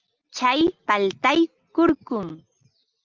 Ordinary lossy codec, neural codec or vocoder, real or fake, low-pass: Opus, 32 kbps; none; real; 7.2 kHz